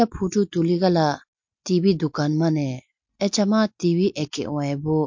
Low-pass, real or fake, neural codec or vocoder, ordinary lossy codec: 7.2 kHz; real; none; MP3, 48 kbps